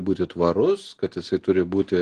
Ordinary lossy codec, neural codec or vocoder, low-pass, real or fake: Opus, 16 kbps; none; 9.9 kHz; real